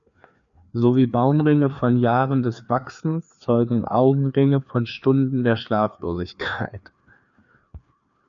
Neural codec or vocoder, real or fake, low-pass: codec, 16 kHz, 2 kbps, FreqCodec, larger model; fake; 7.2 kHz